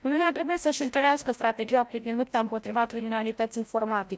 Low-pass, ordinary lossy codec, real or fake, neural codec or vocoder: none; none; fake; codec, 16 kHz, 0.5 kbps, FreqCodec, larger model